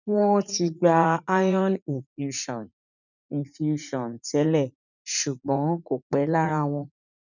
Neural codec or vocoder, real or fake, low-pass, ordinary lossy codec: vocoder, 44.1 kHz, 80 mel bands, Vocos; fake; 7.2 kHz; none